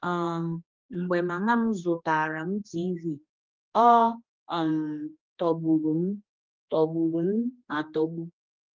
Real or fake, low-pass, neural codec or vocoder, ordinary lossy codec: fake; 7.2 kHz; codec, 16 kHz, 2 kbps, X-Codec, HuBERT features, trained on general audio; Opus, 24 kbps